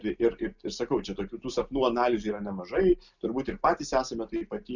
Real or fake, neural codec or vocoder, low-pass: real; none; 7.2 kHz